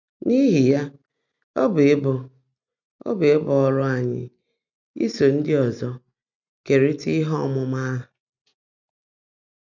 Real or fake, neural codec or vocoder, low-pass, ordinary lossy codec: real; none; 7.2 kHz; none